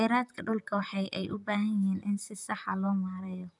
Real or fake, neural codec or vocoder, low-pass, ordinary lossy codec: fake; vocoder, 44.1 kHz, 128 mel bands, Pupu-Vocoder; 10.8 kHz; none